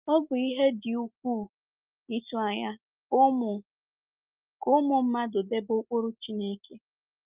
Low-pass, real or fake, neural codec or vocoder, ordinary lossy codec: 3.6 kHz; real; none; Opus, 24 kbps